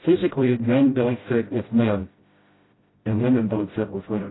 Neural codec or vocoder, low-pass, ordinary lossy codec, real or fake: codec, 16 kHz, 0.5 kbps, FreqCodec, smaller model; 7.2 kHz; AAC, 16 kbps; fake